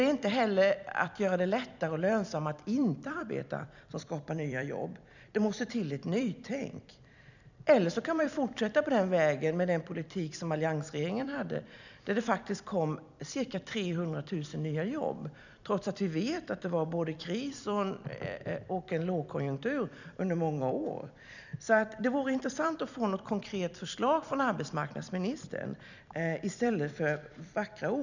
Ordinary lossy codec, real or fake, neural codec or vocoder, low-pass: none; fake; vocoder, 44.1 kHz, 128 mel bands every 256 samples, BigVGAN v2; 7.2 kHz